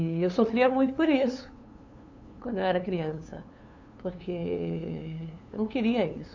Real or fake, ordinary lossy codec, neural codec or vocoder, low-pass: fake; none; codec, 16 kHz, 2 kbps, FunCodec, trained on LibriTTS, 25 frames a second; 7.2 kHz